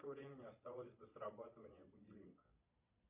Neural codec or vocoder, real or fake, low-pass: vocoder, 22.05 kHz, 80 mel bands, Vocos; fake; 3.6 kHz